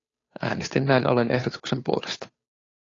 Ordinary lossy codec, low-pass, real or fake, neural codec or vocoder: AAC, 32 kbps; 7.2 kHz; fake; codec, 16 kHz, 2 kbps, FunCodec, trained on Chinese and English, 25 frames a second